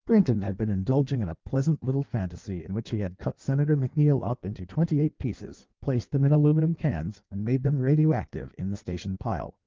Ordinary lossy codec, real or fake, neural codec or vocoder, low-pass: Opus, 32 kbps; fake; codec, 16 kHz in and 24 kHz out, 1.1 kbps, FireRedTTS-2 codec; 7.2 kHz